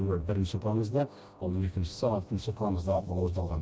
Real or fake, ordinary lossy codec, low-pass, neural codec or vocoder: fake; none; none; codec, 16 kHz, 1 kbps, FreqCodec, smaller model